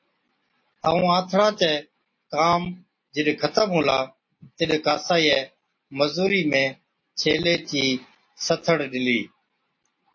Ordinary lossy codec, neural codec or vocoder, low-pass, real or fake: MP3, 32 kbps; none; 7.2 kHz; real